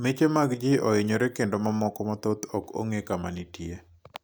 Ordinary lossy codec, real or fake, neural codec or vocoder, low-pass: none; real; none; none